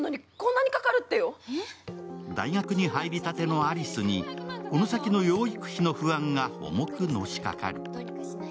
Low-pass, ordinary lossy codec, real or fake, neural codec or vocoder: none; none; real; none